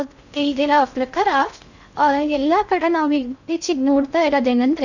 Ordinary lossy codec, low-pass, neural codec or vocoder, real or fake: none; 7.2 kHz; codec, 16 kHz in and 24 kHz out, 0.6 kbps, FocalCodec, streaming, 2048 codes; fake